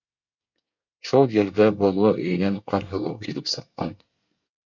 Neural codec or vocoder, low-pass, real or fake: codec, 24 kHz, 1 kbps, SNAC; 7.2 kHz; fake